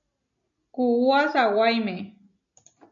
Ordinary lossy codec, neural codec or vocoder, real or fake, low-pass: MP3, 96 kbps; none; real; 7.2 kHz